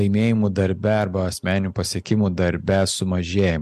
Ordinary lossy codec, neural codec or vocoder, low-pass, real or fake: MP3, 96 kbps; none; 14.4 kHz; real